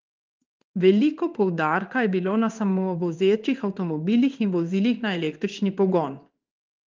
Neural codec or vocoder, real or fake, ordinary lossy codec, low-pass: codec, 16 kHz in and 24 kHz out, 1 kbps, XY-Tokenizer; fake; Opus, 32 kbps; 7.2 kHz